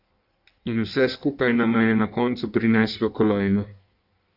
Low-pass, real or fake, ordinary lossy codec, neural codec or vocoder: 5.4 kHz; fake; none; codec, 16 kHz in and 24 kHz out, 1.1 kbps, FireRedTTS-2 codec